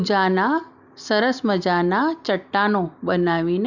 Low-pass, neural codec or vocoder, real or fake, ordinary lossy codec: 7.2 kHz; none; real; none